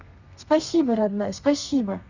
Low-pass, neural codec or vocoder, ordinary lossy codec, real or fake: 7.2 kHz; codec, 32 kHz, 1.9 kbps, SNAC; none; fake